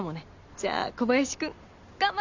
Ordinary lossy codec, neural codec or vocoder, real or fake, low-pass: none; none; real; 7.2 kHz